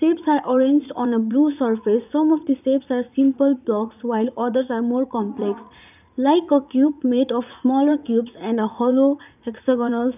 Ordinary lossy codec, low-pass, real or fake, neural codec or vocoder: none; 3.6 kHz; fake; vocoder, 44.1 kHz, 80 mel bands, Vocos